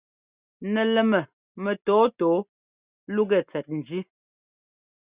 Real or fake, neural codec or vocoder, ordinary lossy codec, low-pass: real; none; Opus, 64 kbps; 3.6 kHz